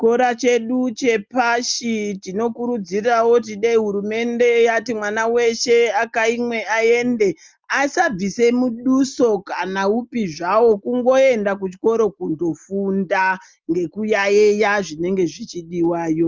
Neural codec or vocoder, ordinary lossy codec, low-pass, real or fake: none; Opus, 32 kbps; 7.2 kHz; real